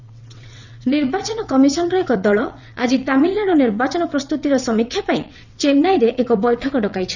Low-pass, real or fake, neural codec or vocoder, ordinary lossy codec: 7.2 kHz; fake; vocoder, 22.05 kHz, 80 mel bands, WaveNeXt; none